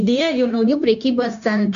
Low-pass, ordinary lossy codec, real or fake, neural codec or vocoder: 7.2 kHz; MP3, 64 kbps; fake; codec, 16 kHz, 0.9 kbps, LongCat-Audio-Codec